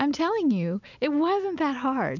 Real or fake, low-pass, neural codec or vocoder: real; 7.2 kHz; none